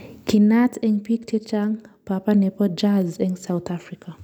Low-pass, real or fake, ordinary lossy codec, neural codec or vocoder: 19.8 kHz; real; none; none